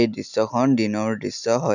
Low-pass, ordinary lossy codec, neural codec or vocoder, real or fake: 7.2 kHz; none; none; real